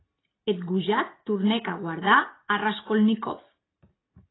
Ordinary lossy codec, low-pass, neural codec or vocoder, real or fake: AAC, 16 kbps; 7.2 kHz; none; real